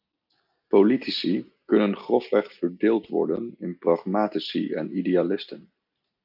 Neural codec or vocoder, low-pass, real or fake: none; 5.4 kHz; real